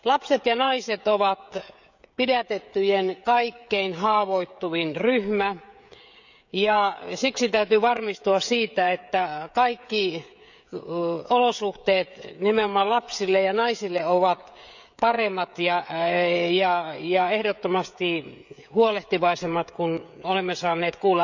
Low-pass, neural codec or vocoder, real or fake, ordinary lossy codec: 7.2 kHz; codec, 16 kHz, 16 kbps, FreqCodec, smaller model; fake; none